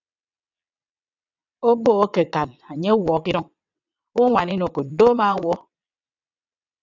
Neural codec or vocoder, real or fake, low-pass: vocoder, 22.05 kHz, 80 mel bands, WaveNeXt; fake; 7.2 kHz